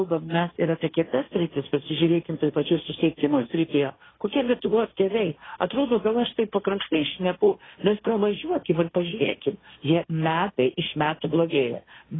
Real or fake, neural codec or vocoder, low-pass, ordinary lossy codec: fake; codec, 16 kHz, 1.1 kbps, Voila-Tokenizer; 7.2 kHz; AAC, 16 kbps